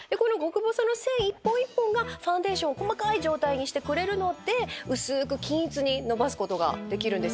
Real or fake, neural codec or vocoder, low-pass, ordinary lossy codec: real; none; none; none